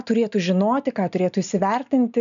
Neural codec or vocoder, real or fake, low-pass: none; real; 7.2 kHz